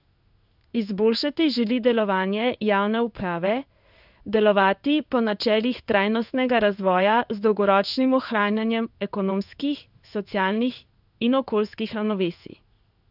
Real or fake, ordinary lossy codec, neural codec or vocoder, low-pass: fake; none; codec, 16 kHz in and 24 kHz out, 1 kbps, XY-Tokenizer; 5.4 kHz